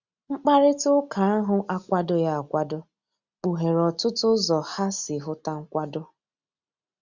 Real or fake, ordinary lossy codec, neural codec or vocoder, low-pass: real; Opus, 64 kbps; none; 7.2 kHz